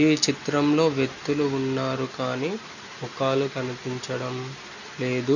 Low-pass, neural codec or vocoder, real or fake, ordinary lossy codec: 7.2 kHz; none; real; none